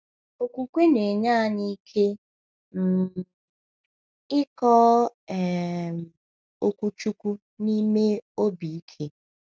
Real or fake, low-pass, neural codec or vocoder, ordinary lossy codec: real; none; none; none